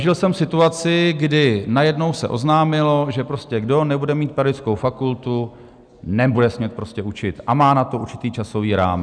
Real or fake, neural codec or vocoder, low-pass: real; none; 9.9 kHz